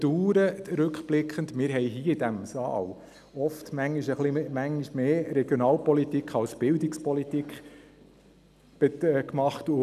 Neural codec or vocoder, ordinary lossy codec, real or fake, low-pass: none; none; real; 14.4 kHz